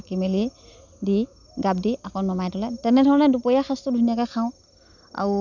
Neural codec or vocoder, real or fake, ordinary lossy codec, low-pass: none; real; none; 7.2 kHz